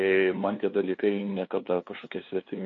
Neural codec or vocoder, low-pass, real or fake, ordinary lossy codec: codec, 16 kHz, 2 kbps, FunCodec, trained on LibriTTS, 25 frames a second; 7.2 kHz; fake; AAC, 48 kbps